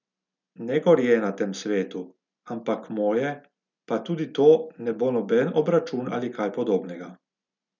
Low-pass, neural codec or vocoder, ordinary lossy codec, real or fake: 7.2 kHz; none; none; real